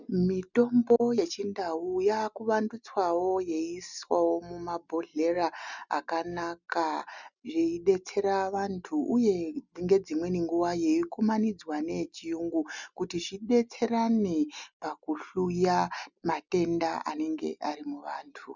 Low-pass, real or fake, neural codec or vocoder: 7.2 kHz; real; none